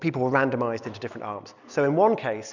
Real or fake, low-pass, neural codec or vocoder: real; 7.2 kHz; none